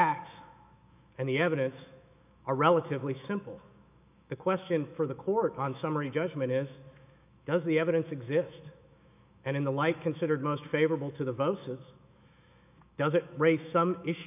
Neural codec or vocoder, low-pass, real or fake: autoencoder, 48 kHz, 128 numbers a frame, DAC-VAE, trained on Japanese speech; 3.6 kHz; fake